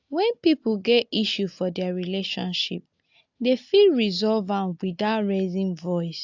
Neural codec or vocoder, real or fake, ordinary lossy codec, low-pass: none; real; AAC, 48 kbps; 7.2 kHz